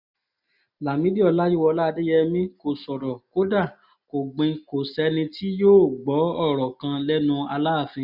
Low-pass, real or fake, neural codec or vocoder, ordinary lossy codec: 5.4 kHz; real; none; none